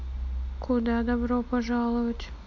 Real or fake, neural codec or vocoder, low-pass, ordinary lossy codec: real; none; 7.2 kHz; MP3, 64 kbps